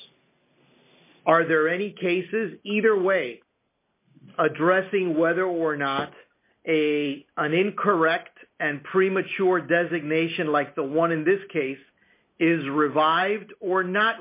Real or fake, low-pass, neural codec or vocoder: real; 3.6 kHz; none